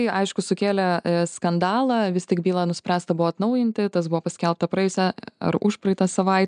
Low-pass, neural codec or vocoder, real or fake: 9.9 kHz; none; real